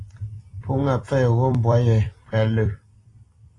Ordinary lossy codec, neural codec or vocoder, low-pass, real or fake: AAC, 32 kbps; vocoder, 44.1 kHz, 128 mel bands every 256 samples, BigVGAN v2; 10.8 kHz; fake